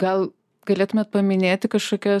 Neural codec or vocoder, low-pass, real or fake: none; 14.4 kHz; real